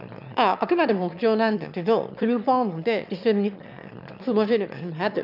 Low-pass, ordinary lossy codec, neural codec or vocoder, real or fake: 5.4 kHz; none; autoencoder, 22.05 kHz, a latent of 192 numbers a frame, VITS, trained on one speaker; fake